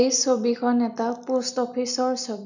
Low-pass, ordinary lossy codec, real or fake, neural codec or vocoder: 7.2 kHz; none; real; none